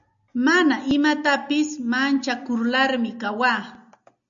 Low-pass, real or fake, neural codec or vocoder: 7.2 kHz; real; none